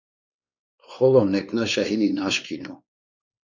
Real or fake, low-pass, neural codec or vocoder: fake; 7.2 kHz; codec, 16 kHz, 2 kbps, X-Codec, WavLM features, trained on Multilingual LibriSpeech